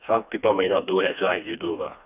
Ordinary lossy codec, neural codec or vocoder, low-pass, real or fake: none; codec, 16 kHz, 2 kbps, FreqCodec, smaller model; 3.6 kHz; fake